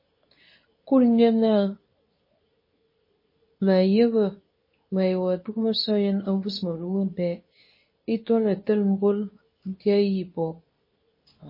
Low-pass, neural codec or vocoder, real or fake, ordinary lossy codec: 5.4 kHz; codec, 24 kHz, 0.9 kbps, WavTokenizer, medium speech release version 2; fake; MP3, 24 kbps